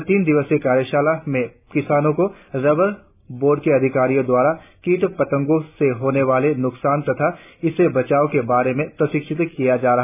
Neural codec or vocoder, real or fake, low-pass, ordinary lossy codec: none; real; 3.6 kHz; none